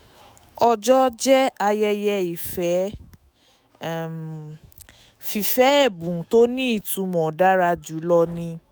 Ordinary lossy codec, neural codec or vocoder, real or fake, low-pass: none; autoencoder, 48 kHz, 128 numbers a frame, DAC-VAE, trained on Japanese speech; fake; none